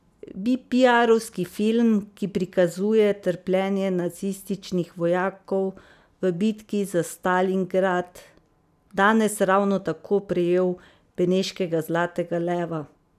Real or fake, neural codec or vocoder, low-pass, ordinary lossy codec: real; none; 14.4 kHz; none